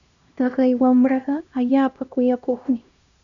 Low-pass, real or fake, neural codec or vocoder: 7.2 kHz; fake; codec, 16 kHz, 1 kbps, X-Codec, HuBERT features, trained on LibriSpeech